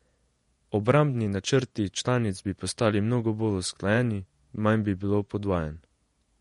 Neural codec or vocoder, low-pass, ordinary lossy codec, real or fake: vocoder, 48 kHz, 128 mel bands, Vocos; 19.8 kHz; MP3, 48 kbps; fake